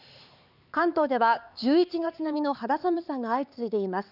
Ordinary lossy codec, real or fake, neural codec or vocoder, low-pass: none; fake; codec, 16 kHz, 4 kbps, X-Codec, HuBERT features, trained on LibriSpeech; 5.4 kHz